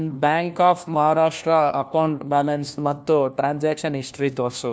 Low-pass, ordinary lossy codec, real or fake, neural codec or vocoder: none; none; fake; codec, 16 kHz, 1 kbps, FunCodec, trained on LibriTTS, 50 frames a second